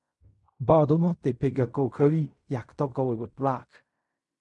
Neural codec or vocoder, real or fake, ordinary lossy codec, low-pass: codec, 16 kHz in and 24 kHz out, 0.4 kbps, LongCat-Audio-Codec, fine tuned four codebook decoder; fake; MP3, 64 kbps; 10.8 kHz